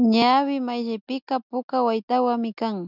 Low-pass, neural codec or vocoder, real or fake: 5.4 kHz; none; real